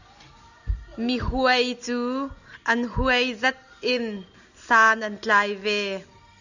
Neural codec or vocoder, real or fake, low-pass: none; real; 7.2 kHz